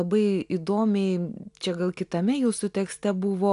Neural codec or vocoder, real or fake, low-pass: none; real; 10.8 kHz